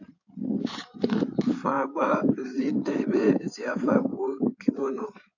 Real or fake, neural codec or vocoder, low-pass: fake; codec, 16 kHz in and 24 kHz out, 2.2 kbps, FireRedTTS-2 codec; 7.2 kHz